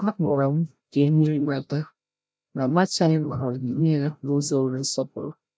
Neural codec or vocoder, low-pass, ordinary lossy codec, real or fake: codec, 16 kHz, 0.5 kbps, FreqCodec, larger model; none; none; fake